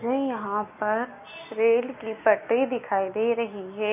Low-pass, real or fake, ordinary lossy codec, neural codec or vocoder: 3.6 kHz; real; none; none